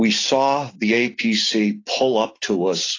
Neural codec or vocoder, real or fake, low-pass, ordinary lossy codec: none; real; 7.2 kHz; AAC, 32 kbps